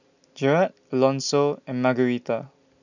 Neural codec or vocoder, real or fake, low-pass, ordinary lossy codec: none; real; 7.2 kHz; none